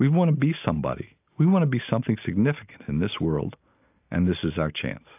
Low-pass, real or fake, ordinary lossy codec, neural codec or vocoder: 3.6 kHz; real; AAC, 32 kbps; none